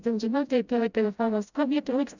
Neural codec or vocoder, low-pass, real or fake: codec, 16 kHz, 0.5 kbps, FreqCodec, smaller model; 7.2 kHz; fake